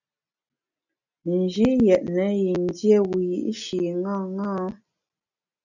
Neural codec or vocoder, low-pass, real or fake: none; 7.2 kHz; real